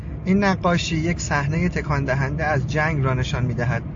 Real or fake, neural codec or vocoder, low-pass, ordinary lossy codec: real; none; 7.2 kHz; AAC, 64 kbps